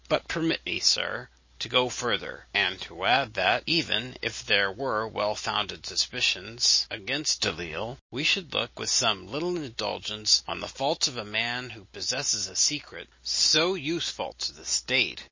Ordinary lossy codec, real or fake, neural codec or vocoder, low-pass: MP3, 32 kbps; real; none; 7.2 kHz